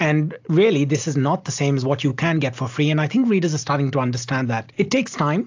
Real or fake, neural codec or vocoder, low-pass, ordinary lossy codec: real; none; 7.2 kHz; AAC, 48 kbps